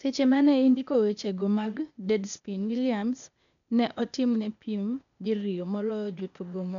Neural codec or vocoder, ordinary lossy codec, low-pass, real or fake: codec, 16 kHz, 0.8 kbps, ZipCodec; none; 7.2 kHz; fake